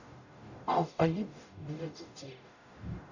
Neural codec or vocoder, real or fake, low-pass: codec, 44.1 kHz, 0.9 kbps, DAC; fake; 7.2 kHz